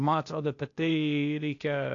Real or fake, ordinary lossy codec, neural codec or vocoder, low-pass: fake; AAC, 48 kbps; codec, 16 kHz, 0.8 kbps, ZipCodec; 7.2 kHz